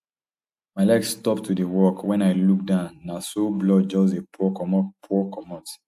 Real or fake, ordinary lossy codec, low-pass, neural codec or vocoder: real; AAC, 96 kbps; 14.4 kHz; none